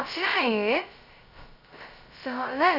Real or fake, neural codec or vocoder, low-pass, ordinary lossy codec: fake; codec, 16 kHz, 0.2 kbps, FocalCodec; 5.4 kHz; none